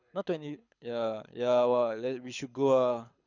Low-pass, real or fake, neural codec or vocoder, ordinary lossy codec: 7.2 kHz; fake; codec, 24 kHz, 6 kbps, HILCodec; none